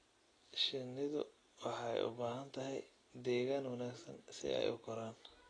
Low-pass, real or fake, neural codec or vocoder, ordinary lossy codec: 9.9 kHz; real; none; AAC, 32 kbps